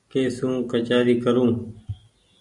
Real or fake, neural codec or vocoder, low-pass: real; none; 10.8 kHz